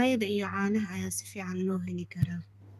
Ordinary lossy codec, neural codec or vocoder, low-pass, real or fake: none; codec, 32 kHz, 1.9 kbps, SNAC; 14.4 kHz; fake